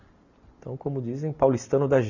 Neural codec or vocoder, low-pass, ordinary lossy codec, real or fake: none; 7.2 kHz; none; real